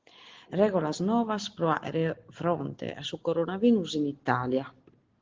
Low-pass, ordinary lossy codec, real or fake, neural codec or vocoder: 7.2 kHz; Opus, 16 kbps; real; none